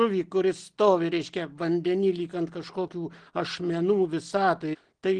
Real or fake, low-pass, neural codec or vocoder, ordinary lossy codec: real; 9.9 kHz; none; Opus, 16 kbps